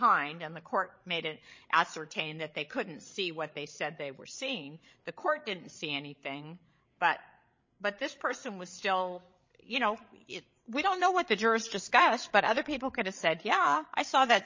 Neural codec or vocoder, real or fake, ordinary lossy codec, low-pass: codec, 16 kHz, 16 kbps, FreqCodec, larger model; fake; MP3, 32 kbps; 7.2 kHz